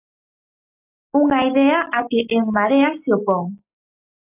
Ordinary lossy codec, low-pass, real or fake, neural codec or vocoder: AAC, 32 kbps; 3.6 kHz; real; none